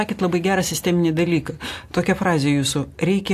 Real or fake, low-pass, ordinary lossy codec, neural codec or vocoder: real; 14.4 kHz; AAC, 64 kbps; none